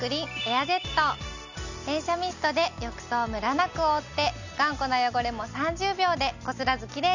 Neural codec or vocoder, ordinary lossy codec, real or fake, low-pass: none; none; real; 7.2 kHz